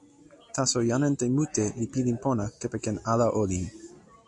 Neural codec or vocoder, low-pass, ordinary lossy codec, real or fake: none; 10.8 kHz; AAC, 64 kbps; real